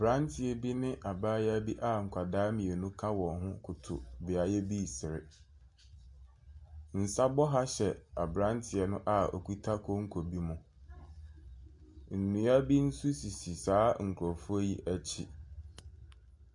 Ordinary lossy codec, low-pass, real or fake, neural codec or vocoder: MP3, 64 kbps; 10.8 kHz; real; none